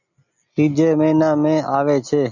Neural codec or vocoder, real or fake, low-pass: none; real; 7.2 kHz